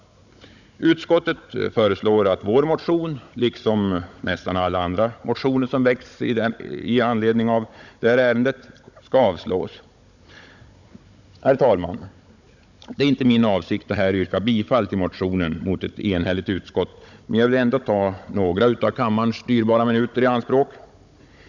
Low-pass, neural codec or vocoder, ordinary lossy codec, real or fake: 7.2 kHz; codec, 16 kHz, 16 kbps, FunCodec, trained on Chinese and English, 50 frames a second; none; fake